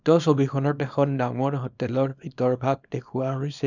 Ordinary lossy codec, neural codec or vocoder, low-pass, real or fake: none; codec, 24 kHz, 0.9 kbps, WavTokenizer, small release; 7.2 kHz; fake